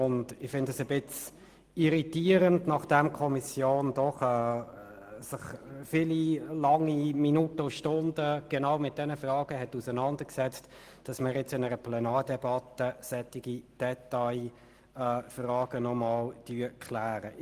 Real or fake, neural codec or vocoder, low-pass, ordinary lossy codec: real; none; 14.4 kHz; Opus, 24 kbps